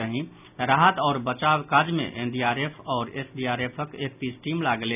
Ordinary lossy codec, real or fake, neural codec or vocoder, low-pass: none; real; none; 3.6 kHz